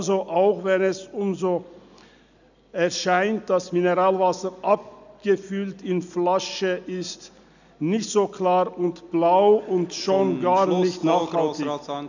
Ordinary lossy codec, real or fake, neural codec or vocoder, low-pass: none; real; none; 7.2 kHz